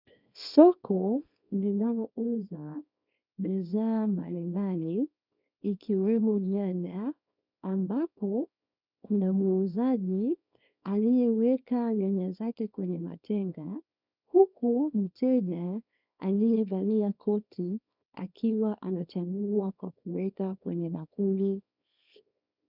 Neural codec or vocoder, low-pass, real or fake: codec, 24 kHz, 0.9 kbps, WavTokenizer, small release; 5.4 kHz; fake